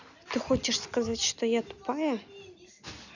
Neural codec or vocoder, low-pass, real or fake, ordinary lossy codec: none; 7.2 kHz; real; none